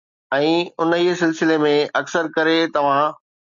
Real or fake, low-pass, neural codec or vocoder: real; 7.2 kHz; none